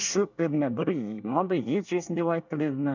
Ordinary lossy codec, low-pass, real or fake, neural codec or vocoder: none; 7.2 kHz; fake; codec, 24 kHz, 1 kbps, SNAC